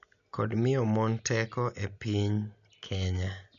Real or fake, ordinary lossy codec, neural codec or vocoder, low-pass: real; none; none; 7.2 kHz